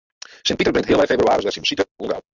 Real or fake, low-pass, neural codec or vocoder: real; 7.2 kHz; none